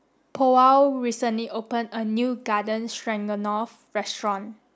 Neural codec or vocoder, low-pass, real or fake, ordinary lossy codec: none; none; real; none